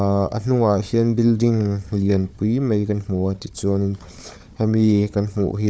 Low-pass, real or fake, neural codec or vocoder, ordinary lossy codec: none; fake; codec, 16 kHz, 4 kbps, FunCodec, trained on Chinese and English, 50 frames a second; none